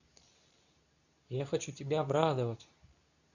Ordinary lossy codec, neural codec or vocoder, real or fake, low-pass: none; codec, 24 kHz, 0.9 kbps, WavTokenizer, medium speech release version 2; fake; 7.2 kHz